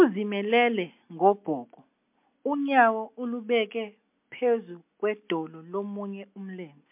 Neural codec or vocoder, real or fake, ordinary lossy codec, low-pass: none; real; MP3, 32 kbps; 3.6 kHz